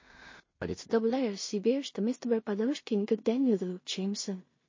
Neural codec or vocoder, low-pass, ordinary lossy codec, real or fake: codec, 16 kHz in and 24 kHz out, 0.4 kbps, LongCat-Audio-Codec, two codebook decoder; 7.2 kHz; MP3, 32 kbps; fake